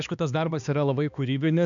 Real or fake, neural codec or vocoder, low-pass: fake; codec, 16 kHz, 2 kbps, X-Codec, HuBERT features, trained on balanced general audio; 7.2 kHz